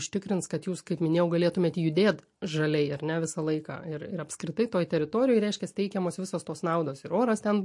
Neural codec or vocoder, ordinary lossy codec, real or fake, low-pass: none; MP3, 48 kbps; real; 10.8 kHz